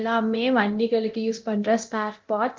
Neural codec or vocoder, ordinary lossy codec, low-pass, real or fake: codec, 24 kHz, 0.9 kbps, DualCodec; Opus, 32 kbps; 7.2 kHz; fake